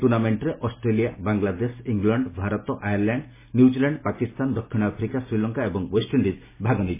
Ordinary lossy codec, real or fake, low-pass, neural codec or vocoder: MP3, 16 kbps; real; 3.6 kHz; none